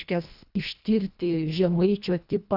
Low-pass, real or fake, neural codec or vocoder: 5.4 kHz; fake; codec, 24 kHz, 1.5 kbps, HILCodec